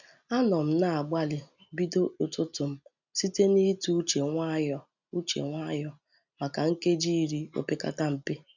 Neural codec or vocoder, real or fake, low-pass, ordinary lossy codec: none; real; 7.2 kHz; none